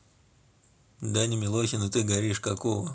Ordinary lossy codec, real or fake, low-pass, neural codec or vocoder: none; real; none; none